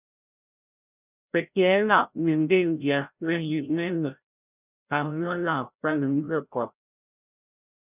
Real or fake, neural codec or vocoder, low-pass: fake; codec, 16 kHz, 0.5 kbps, FreqCodec, larger model; 3.6 kHz